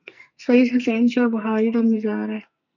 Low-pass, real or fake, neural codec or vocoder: 7.2 kHz; fake; codec, 44.1 kHz, 2.6 kbps, SNAC